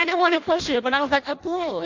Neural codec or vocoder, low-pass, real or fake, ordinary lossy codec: codec, 16 kHz in and 24 kHz out, 0.6 kbps, FireRedTTS-2 codec; 7.2 kHz; fake; MP3, 64 kbps